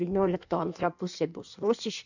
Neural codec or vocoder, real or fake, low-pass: codec, 16 kHz in and 24 kHz out, 1.1 kbps, FireRedTTS-2 codec; fake; 7.2 kHz